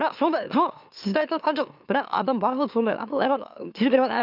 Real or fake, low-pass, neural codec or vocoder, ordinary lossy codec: fake; 5.4 kHz; autoencoder, 44.1 kHz, a latent of 192 numbers a frame, MeloTTS; none